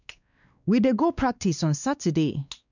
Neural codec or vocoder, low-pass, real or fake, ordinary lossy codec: codec, 16 kHz, 2 kbps, X-Codec, WavLM features, trained on Multilingual LibriSpeech; 7.2 kHz; fake; none